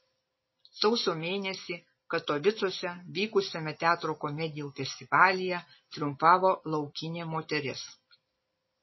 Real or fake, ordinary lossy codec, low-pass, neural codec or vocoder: real; MP3, 24 kbps; 7.2 kHz; none